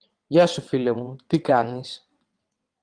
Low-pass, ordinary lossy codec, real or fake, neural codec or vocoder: 9.9 kHz; Opus, 24 kbps; fake; vocoder, 22.05 kHz, 80 mel bands, WaveNeXt